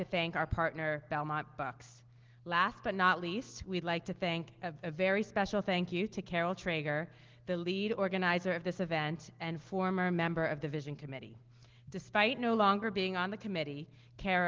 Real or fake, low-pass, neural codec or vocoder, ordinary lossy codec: real; 7.2 kHz; none; Opus, 16 kbps